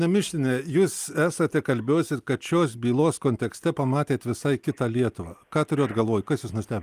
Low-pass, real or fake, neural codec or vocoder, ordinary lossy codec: 14.4 kHz; real; none; Opus, 32 kbps